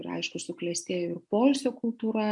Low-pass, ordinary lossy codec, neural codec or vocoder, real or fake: 10.8 kHz; MP3, 64 kbps; none; real